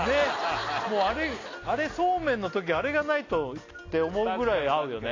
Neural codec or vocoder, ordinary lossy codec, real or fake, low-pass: none; AAC, 32 kbps; real; 7.2 kHz